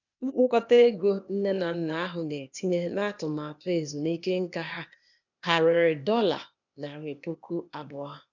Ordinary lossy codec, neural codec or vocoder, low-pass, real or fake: none; codec, 16 kHz, 0.8 kbps, ZipCodec; 7.2 kHz; fake